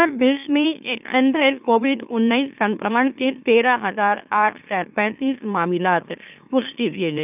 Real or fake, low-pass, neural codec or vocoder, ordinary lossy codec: fake; 3.6 kHz; autoencoder, 44.1 kHz, a latent of 192 numbers a frame, MeloTTS; none